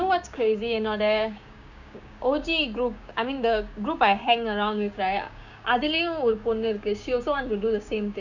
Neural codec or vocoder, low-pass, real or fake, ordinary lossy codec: codec, 16 kHz, 6 kbps, DAC; 7.2 kHz; fake; none